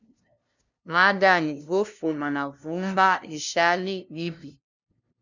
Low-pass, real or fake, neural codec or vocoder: 7.2 kHz; fake; codec, 16 kHz, 0.5 kbps, FunCodec, trained on LibriTTS, 25 frames a second